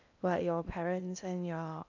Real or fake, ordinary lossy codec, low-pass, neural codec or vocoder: fake; none; 7.2 kHz; codec, 16 kHz in and 24 kHz out, 0.8 kbps, FocalCodec, streaming, 65536 codes